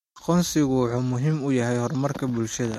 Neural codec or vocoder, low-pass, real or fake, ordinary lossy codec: none; 19.8 kHz; real; MP3, 64 kbps